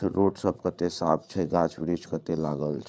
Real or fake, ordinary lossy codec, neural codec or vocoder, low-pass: fake; none; codec, 16 kHz, 8 kbps, FreqCodec, larger model; none